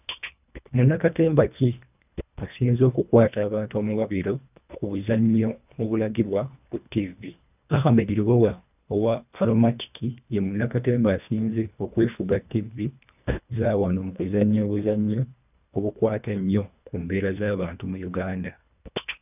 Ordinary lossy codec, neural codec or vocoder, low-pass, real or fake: none; codec, 24 kHz, 1.5 kbps, HILCodec; 3.6 kHz; fake